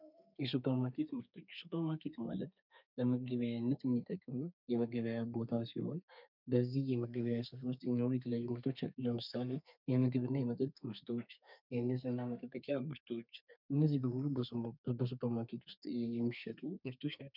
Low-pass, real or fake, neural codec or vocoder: 5.4 kHz; fake; codec, 32 kHz, 1.9 kbps, SNAC